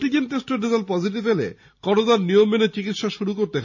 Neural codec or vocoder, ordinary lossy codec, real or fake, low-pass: none; none; real; 7.2 kHz